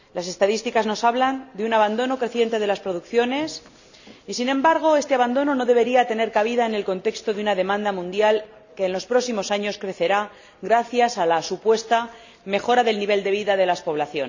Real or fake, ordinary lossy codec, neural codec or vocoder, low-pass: real; none; none; 7.2 kHz